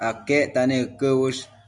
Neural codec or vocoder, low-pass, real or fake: none; 10.8 kHz; real